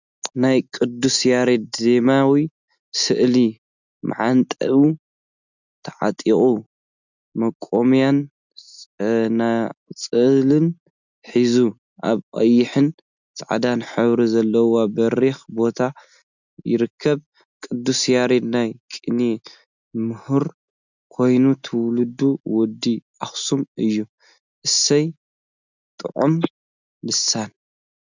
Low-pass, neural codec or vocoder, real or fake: 7.2 kHz; none; real